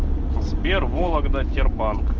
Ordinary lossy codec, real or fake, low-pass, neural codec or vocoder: Opus, 24 kbps; real; 7.2 kHz; none